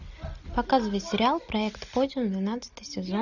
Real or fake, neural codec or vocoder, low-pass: real; none; 7.2 kHz